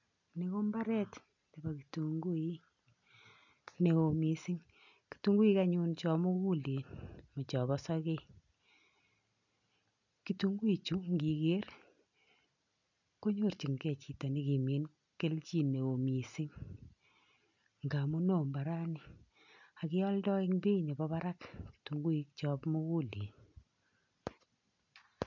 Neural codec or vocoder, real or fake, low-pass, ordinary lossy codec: none; real; 7.2 kHz; none